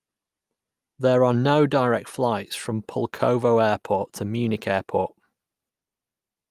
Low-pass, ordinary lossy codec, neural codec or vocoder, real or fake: 14.4 kHz; Opus, 32 kbps; none; real